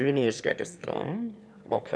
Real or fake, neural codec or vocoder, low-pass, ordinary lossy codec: fake; autoencoder, 22.05 kHz, a latent of 192 numbers a frame, VITS, trained on one speaker; none; none